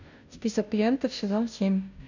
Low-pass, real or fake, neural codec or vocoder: 7.2 kHz; fake; codec, 16 kHz, 0.5 kbps, FunCodec, trained on Chinese and English, 25 frames a second